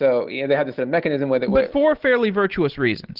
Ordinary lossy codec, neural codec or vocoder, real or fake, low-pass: Opus, 16 kbps; none; real; 5.4 kHz